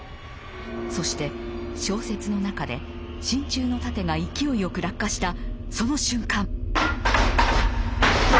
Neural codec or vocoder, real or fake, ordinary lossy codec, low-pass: none; real; none; none